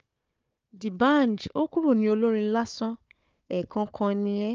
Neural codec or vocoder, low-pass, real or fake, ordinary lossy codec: codec, 16 kHz, 4 kbps, FunCodec, trained on Chinese and English, 50 frames a second; 7.2 kHz; fake; Opus, 24 kbps